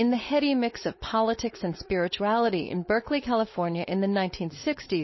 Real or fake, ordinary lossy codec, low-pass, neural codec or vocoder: fake; MP3, 24 kbps; 7.2 kHz; codec, 16 kHz, 4.8 kbps, FACodec